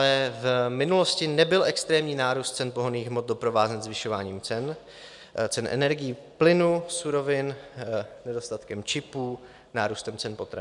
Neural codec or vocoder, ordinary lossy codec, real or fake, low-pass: none; MP3, 96 kbps; real; 10.8 kHz